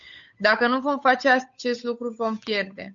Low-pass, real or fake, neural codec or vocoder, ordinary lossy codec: 7.2 kHz; fake; codec, 16 kHz, 8 kbps, FunCodec, trained on Chinese and English, 25 frames a second; MP3, 64 kbps